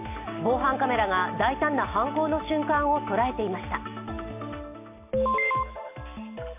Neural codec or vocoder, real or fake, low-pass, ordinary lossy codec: none; real; 3.6 kHz; MP3, 24 kbps